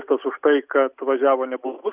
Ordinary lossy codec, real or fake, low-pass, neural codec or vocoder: Opus, 64 kbps; real; 3.6 kHz; none